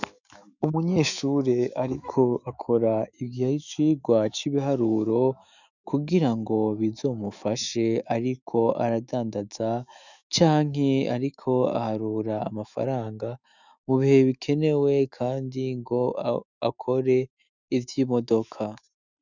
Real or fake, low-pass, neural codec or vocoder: fake; 7.2 kHz; autoencoder, 48 kHz, 128 numbers a frame, DAC-VAE, trained on Japanese speech